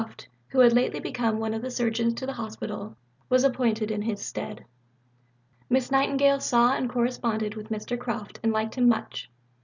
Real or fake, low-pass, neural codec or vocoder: real; 7.2 kHz; none